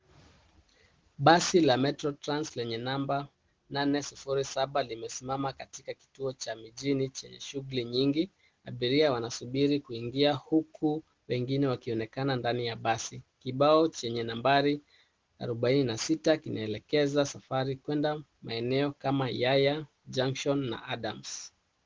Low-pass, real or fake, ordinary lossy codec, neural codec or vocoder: 7.2 kHz; real; Opus, 16 kbps; none